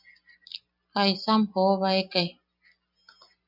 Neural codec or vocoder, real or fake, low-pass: none; real; 5.4 kHz